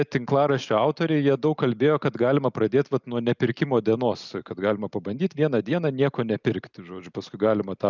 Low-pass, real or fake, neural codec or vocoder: 7.2 kHz; real; none